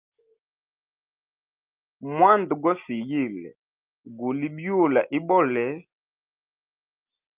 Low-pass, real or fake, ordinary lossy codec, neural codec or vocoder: 3.6 kHz; real; Opus, 24 kbps; none